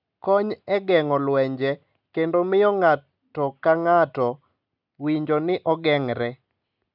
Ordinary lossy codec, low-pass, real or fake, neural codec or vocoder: none; 5.4 kHz; real; none